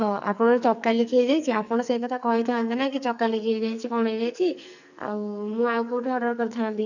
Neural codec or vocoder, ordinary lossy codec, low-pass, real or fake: codec, 44.1 kHz, 2.6 kbps, SNAC; none; 7.2 kHz; fake